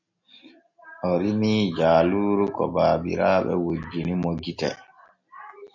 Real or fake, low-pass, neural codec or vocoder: real; 7.2 kHz; none